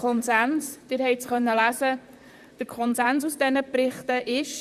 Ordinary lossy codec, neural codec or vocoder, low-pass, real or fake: Opus, 64 kbps; vocoder, 44.1 kHz, 128 mel bands, Pupu-Vocoder; 14.4 kHz; fake